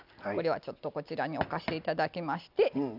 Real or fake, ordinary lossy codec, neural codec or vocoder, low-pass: real; none; none; 5.4 kHz